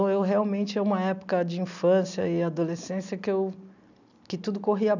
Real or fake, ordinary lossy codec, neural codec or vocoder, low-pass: real; none; none; 7.2 kHz